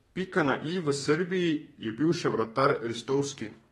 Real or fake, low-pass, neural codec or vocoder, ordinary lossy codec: fake; 14.4 kHz; codec, 32 kHz, 1.9 kbps, SNAC; AAC, 32 kbps